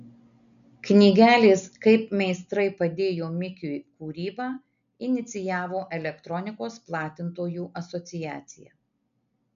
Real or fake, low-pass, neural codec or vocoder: real; 7.2 kHz; none